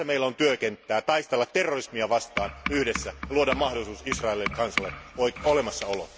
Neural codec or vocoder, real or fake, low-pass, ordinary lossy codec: none; real; none; none